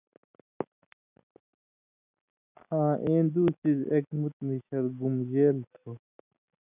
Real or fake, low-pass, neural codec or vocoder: real; 3.6 kHz; none